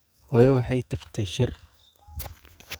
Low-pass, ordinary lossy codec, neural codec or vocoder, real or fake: none; none; codec, 44.1 kHz, 2.6 kbps, SNAC; fake